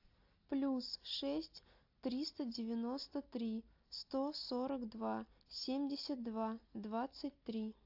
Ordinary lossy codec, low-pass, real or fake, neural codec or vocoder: AAC, 48 kbps; 5.4 kHz; real; none